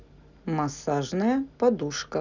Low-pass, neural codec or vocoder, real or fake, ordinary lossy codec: 7.2 kHz; none; real; none